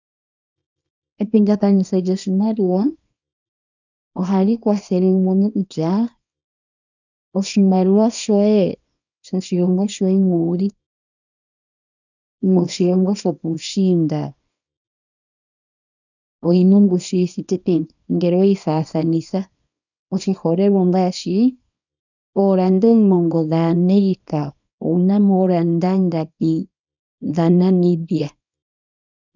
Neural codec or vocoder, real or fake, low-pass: codec, 24 kHz, 0.9 kbps, WavTokenizer, small release; fake; 7.2 kHz